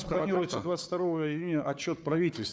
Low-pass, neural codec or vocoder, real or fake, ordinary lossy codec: none; codec, 16 kHz, 4 kbps, FunCodec, trained on Chinese and English, 50 frames a second; fake; none